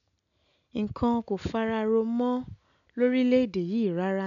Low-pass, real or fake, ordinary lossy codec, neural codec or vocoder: 7.2 kHz; real; none; none